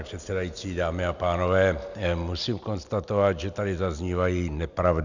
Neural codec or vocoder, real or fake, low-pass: none; real; 7.2 kHz